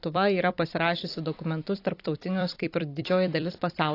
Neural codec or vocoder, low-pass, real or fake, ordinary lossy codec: vocoder, 44.1 kHz, 128 mel bands every 256 samples, BigVGAN v2; 5.4 kHz; fake; AAC, 32 kbps